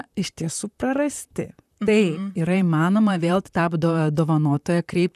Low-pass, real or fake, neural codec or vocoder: 14.4 kHz; fake; vocoder, 44.1 kHz, 128 mel bands, Pupu-Vocoder